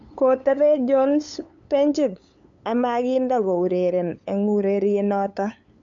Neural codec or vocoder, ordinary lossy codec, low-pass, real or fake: codec, 16 kHz, 8 kbps, FunCodec, trained on LibriTTS, 25 frames a second; MP3, 64 kbps; 7.2 kHz; fake